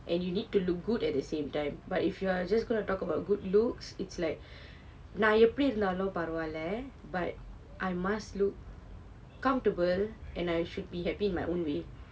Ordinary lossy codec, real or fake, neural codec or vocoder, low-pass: none; real; none; none